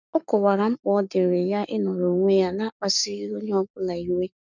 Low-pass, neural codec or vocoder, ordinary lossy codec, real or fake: 7.2 kHz; codec, 44.1 kHz, 7.8 kbps, Pupu-Codec; none; fake